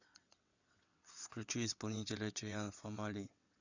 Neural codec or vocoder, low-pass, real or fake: vocoder, 22.05 kHz, 80 mel bands, WaveNeXt; 7.2 kHz; fake